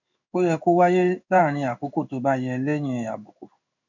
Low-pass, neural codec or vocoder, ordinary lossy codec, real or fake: 7.2 kHz; codec, 16 kHz in and 24 kHz out, 1 kbps, XY-Tokenizer; none; fake